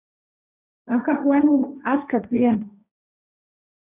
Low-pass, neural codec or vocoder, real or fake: 3.6 kHz; codec, 16 kHz, 1.1 kbps, Voila-Tokenizer; fake